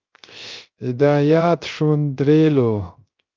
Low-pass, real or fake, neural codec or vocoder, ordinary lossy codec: 7.2 kHz; fake; codec, 16 kHz, 0.3 kbps, FocalCodec; Opus, 24 kbps